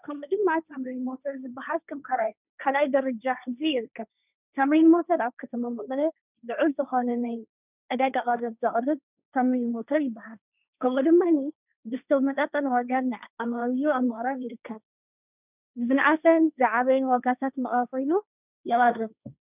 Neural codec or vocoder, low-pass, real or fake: codec, 16 kHz, 1.1 kbps, Voila-Tokenizer; 3.6 kHz; fake